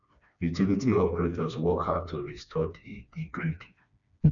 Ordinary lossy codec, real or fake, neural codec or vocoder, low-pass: none; fake; codec, 16 kHz, 2 kbps, FreqCodec, smaller model; 7.2 kHz